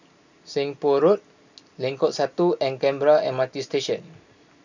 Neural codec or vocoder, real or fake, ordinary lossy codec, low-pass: none; real; none; 7.2 kHz